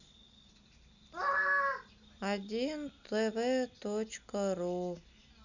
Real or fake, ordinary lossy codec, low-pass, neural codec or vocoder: real; none; 7.2 kHz; none